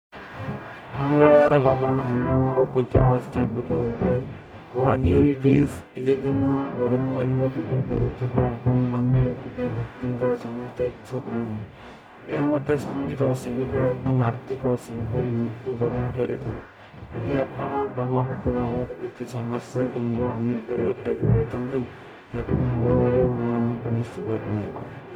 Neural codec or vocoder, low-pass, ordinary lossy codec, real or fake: codec, 44.1 kHz, 0.9 kbps, DAC; 19.8 kHz; none; fake